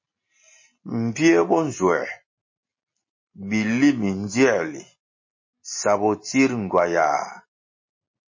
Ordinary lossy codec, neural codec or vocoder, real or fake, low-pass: MP3, 32 kbps; none; real; 7.2 kHz